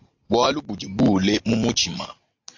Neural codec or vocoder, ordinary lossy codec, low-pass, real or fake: none; AAC, 48 kbps; 7.2 kHz; real